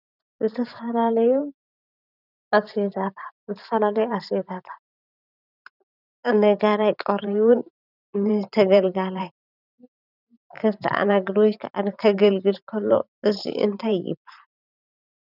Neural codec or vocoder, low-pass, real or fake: vocoder, 44.1 kHz, 128 mel bands every 512 samples, BigVGAN v2; 5.4 kHz; fake